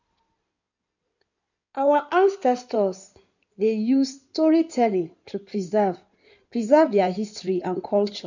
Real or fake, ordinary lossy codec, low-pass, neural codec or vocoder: fake; none; 7.2 kHz; codec, 16 kHz in and 24 kHz out, 2.2 kbps, FireRedTTS-2 codec